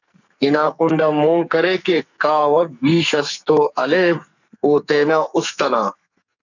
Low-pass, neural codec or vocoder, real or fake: 7.2 kHz; codec, 44.1 kHz, 2.6 kbps, SNAC; fake